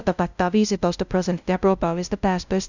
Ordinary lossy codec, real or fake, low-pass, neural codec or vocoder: none; fake; 7.2 kHz; codec, 16 kHz, 0.5 kbps, FunCodec, trained on LibriTTS, 25 frames a second